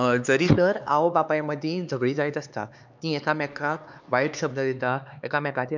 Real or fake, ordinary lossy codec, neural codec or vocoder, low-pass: fake; none; codec, 16 kHz, 4 kbps, X-Codec, HuBERT features, trained on LibriSpeech; 7.2 kHz